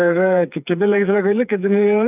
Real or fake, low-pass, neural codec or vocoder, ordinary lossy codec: fake; 3.6 kHz; codec, 16 kHz, 4 kbps, FreqCodec, smaller model; none